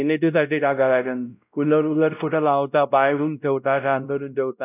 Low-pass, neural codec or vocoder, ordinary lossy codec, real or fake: 3.6 kHz; codec, 16 kHz, 0.5 kbps, X-Codec, WavLM features, trained on Multilingual LibriSpeech; none; fake